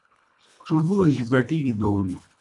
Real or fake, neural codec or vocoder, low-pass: fake; codec, 24 kHz, 1.5 kbps, HILCodec; 10.8 kHz